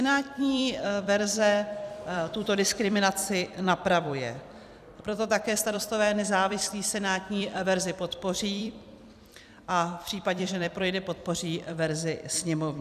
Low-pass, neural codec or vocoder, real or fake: 14.4 kHz; vocoder, 44.1 kHz, 128 mel bands every 256 samples, BigVGAN v2; fake